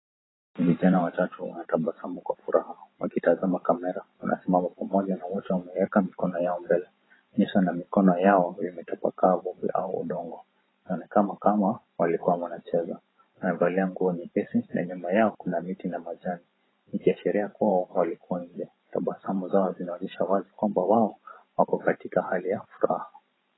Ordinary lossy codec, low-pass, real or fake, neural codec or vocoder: AAC, 16 kbps; 7.2 kHz; fake; vocoder, 44.1 kHz, 128 mel bands every 512 samples, BigVGAN v2